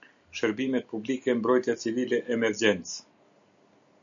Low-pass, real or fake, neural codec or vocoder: 7.2 kHz; real; none